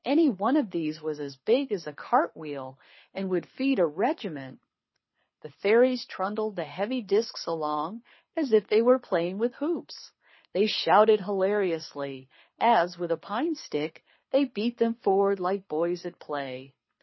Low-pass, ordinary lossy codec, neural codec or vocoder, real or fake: 7.2 kHz; MP3, 24 kbps; codec, 24 kHz, 6 kbps, HILCodec; fake